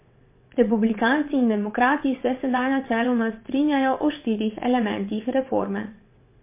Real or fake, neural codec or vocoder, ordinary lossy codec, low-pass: fake; vocoder, 22.05 kHz, 80 mel bands, WaveNeXt; MP3, 24 kbps; 3.6 kHz